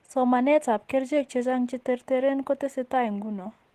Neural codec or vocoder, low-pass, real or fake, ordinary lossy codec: none; 14.4 kHz; real; Opus, 16 kbps